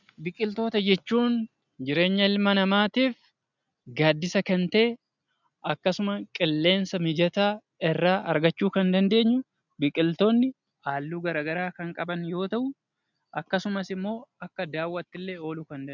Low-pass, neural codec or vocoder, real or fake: 7.2 kHz; none; real